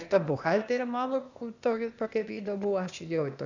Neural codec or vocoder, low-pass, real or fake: codec, 16 kHz, 0.8 kbps, ZipCodec; 7.2 kHz; fake